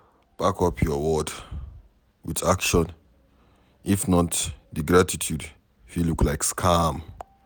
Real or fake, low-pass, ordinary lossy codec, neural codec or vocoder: real; none; none; none